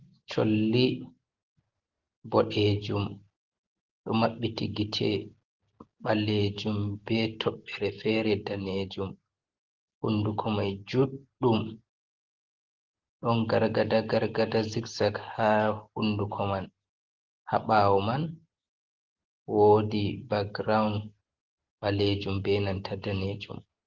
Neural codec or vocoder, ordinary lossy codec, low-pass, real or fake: none; Opus, 16 kbps; 7.2 kHz; real